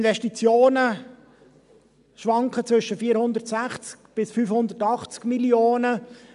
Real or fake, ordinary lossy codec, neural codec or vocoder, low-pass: real; none; none; 10.8 kHz